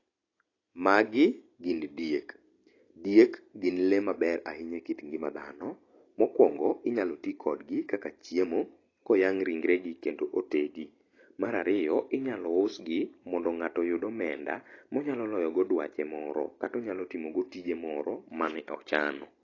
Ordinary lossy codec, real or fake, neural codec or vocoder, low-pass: AAC, 32 kbps; real; none; 7.2 kHz